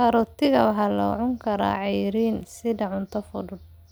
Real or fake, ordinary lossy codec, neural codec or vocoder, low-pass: real; none; none; none